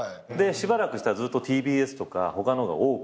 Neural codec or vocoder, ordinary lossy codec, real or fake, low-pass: none; none; real; none